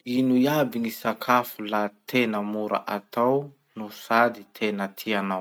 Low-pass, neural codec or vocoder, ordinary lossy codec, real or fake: none; none; none; real